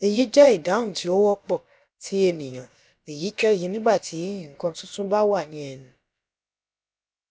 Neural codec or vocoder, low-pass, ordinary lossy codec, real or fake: codec, 16 kHz, about 1 kbps, DyCAST, with the encoder's durations; none; none; fake